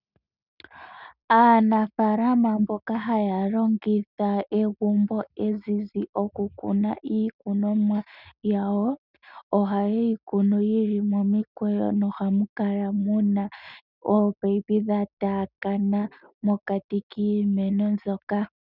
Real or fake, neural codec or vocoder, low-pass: real; none; 5.4 kHz